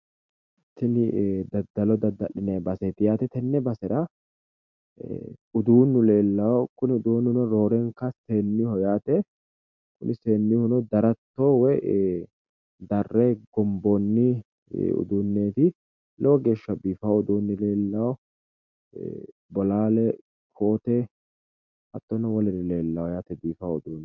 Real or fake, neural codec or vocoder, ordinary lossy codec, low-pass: real; none; MP3, 64 kbps; 7.2 kHz